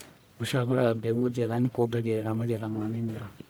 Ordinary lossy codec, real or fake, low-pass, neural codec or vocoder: none; fake; none; codec, 44.1 kHz, 1.7 kbps, Pupu-Codec